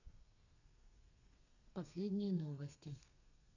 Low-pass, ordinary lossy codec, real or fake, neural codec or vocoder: 7.2 kHz; none; fake; codec, 32 kHz, 1.9 kbps, SNAC